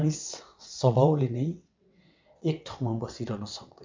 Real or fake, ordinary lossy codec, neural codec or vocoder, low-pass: fake; MP3, 64 kbps; vocoder, 22.05 kHz, 80 mel bands, WaveNeXt; 7.2 kHz